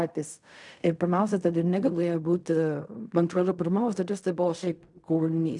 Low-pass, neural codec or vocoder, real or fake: 10.8 kHz; codec, 16 kHz in and 24 kHz out, 0.4 kbps, LongCat-Audio-Codec, fine tuned four codebook decoder; fake